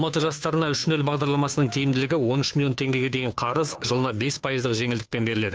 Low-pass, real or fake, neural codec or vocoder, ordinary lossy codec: none; fake; codec, 16 kHz, 2 kbps, FunCodec, trained on Chinese and English, 25 frames a second; none